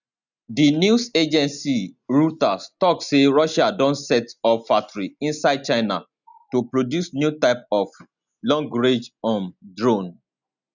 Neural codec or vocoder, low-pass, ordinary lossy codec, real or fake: none; 7.2 kHz; none; real